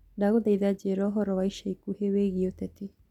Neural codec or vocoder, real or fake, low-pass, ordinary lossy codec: none; real; 19.8 kHz; none